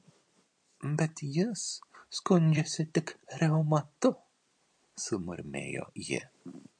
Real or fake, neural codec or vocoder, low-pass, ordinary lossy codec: fake; vocoder, 44.1 kHz, 128 mel bands every 256 samples, BigVGAN v2; 9.9 kHz; MP3, 48 kbps